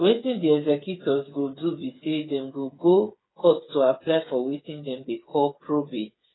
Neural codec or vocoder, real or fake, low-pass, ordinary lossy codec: codec, 16 kHz, 8 kbps, FreqCodec, smaller model; fake; 7.2 kHz; AAC, 16 kbps